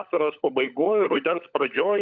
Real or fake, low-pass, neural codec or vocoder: fake; 7.2 kHz; codec, 16 kHz, 16 kbps, FunCodec, trained on LibriTTS, 50 frames a second